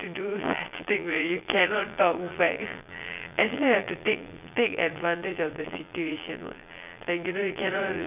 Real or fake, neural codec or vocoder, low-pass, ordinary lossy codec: fake; vocoder, 22.05 kHz, 80 mel bands, Vocos; 3.6 kHz; none